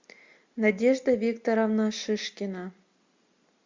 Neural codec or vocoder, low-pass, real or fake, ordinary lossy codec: none; 7.2 kHz; real; MP3, 64 kbps